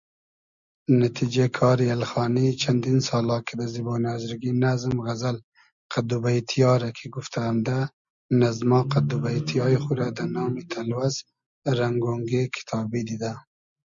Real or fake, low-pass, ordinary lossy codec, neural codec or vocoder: real; 7.2 kHz; Opus, 64 kbps; none